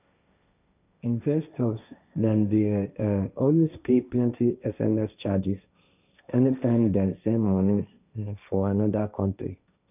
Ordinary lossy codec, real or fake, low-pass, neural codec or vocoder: none; fake; 3.6 kHz; codec, 16 kHz, 1.1 kbps, Voila-Tokenizer